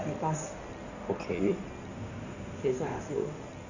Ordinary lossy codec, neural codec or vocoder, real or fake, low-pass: Opus, 64 kbps; codec, 16 kHz in and 24 kHz out, 2.2 kbps, FireRedTTS-2 codec; fake; 7.2 kHz